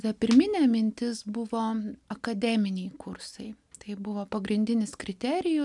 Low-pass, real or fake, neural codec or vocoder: 10.8 kHz; real; none